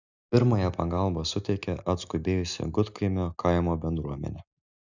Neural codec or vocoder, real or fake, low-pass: none; real; 7.2 kHz